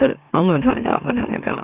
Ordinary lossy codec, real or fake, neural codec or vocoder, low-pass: Opus, 64 kbps; fake; autoencoder, 44.1 kHz, a latent of 192 numbers a frame, MeloTTS; 3.6 kHz